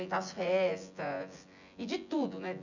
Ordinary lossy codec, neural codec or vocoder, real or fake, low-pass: none; vocoder, 24 kHz, 100 mel bands, Vocos; fake; 7.2 kHz